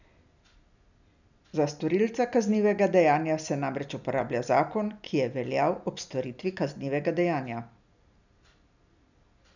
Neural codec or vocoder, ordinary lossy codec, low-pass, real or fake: none; none; 7.2 kHz; real